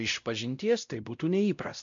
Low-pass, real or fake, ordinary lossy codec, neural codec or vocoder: 7.2 kHz; fake; MP3, 64 kbps; codec, 16 kHz, 0.5 kbps, X-Codec, WavLM features, trained on Multilingual LibriSpeech